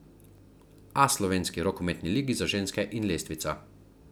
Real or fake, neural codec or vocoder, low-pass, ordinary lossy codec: real; none; none; none